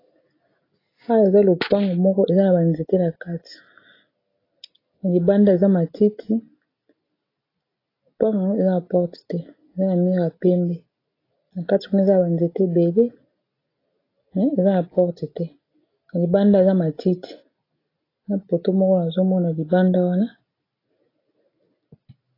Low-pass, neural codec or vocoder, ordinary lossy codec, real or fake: 5.4 kHz; none; AAC, 24 kbps; real